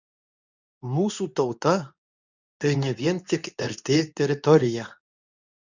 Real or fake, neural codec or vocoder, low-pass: fake; codec, 24 kHz, 0.9 kbps, WavTokenizer, medium speech release version 2; 7.2 kHz